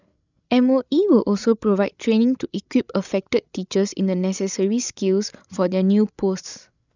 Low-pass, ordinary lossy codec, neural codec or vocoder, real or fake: 7.2 kHz; none; codec, 16 kHz, 16 kbps, FreqCodec, larger model; fake